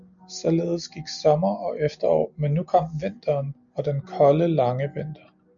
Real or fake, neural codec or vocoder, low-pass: real; none; 7.2 kHz